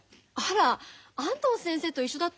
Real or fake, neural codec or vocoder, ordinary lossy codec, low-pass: real; none; none; none